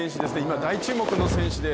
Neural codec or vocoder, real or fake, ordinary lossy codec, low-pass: none; real; none; none